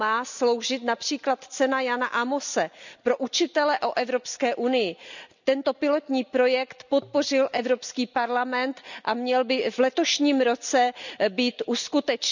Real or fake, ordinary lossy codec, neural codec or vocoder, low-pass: real; none; none; 7.2 kHz